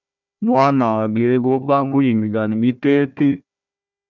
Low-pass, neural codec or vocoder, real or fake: 7.2 kHz; codec, 16 kHz, 1 kbps, FunCodec, trained on Chinese and English, 50 frames a second; fake